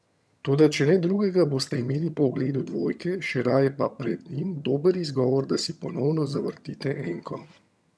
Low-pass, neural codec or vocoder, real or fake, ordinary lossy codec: none; vocoder, 22.05 kHz, 80 mel bands, HiFi-GAN; fake; none